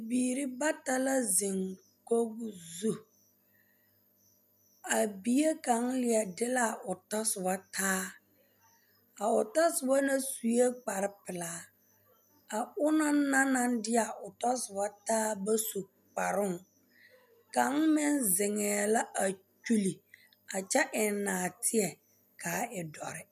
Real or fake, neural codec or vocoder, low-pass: real; none; 14.4 kHz